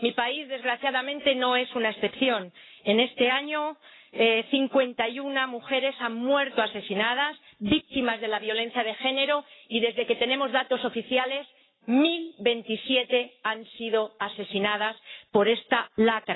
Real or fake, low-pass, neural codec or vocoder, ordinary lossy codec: fake; 7.2 kHz; codec, 44.1 kHz, 7.8 kbps, Pupu-Codec; AAC, 16 kbps